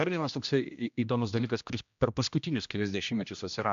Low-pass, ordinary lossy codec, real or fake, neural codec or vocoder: 7.2 kHz; MP3, 64 kbps; fake; codec, 16 kHz, 1 kbps, X-Codec, HuBERT features, trained on general audio